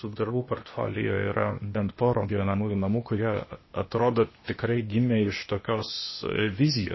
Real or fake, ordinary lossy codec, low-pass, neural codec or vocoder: fake; MP3, 24 kbps; 7.2 kHz; codec, 16 kHz, 0.8 kbps, ZipCodec